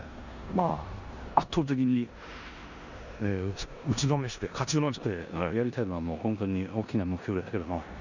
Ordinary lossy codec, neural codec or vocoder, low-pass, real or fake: none; codec, 16 kHz in and 24 kHz out, 0.9 kbps, LongCat-Audio-Codec, four codebook decoder; 7.2 kHz; fake